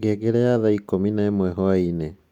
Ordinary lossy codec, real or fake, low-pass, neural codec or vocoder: Opus, 64 kbps; real; 19.8 kHz; none